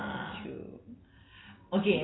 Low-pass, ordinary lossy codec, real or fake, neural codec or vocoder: 7.2 kHz; AAC, 16 kbps; real; none